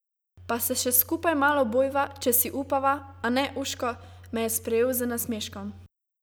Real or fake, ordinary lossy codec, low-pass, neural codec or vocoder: real; none; none; none